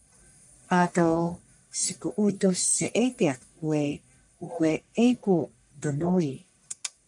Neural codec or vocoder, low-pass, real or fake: codec, 44.1 kHz, 1.7 kbps, Pupu-Codec; 10.8 kHz; fake